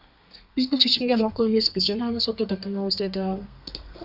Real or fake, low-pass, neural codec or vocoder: fake; 5.4 kHz; codec, 32 kHz, 1.9 kbps, SNAC